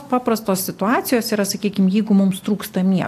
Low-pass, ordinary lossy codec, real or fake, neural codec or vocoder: 14.4 kHz; AAC, 64 kbps; real; none